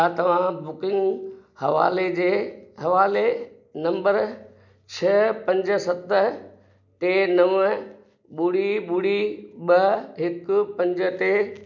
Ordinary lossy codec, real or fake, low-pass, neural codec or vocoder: none; real; 7.2 kHz; none